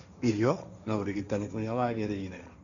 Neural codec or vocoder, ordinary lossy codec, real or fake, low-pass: codec, 16 kHz, 1.1 kbps, Voila-Tokenizer; none; fake; 7.2 kHz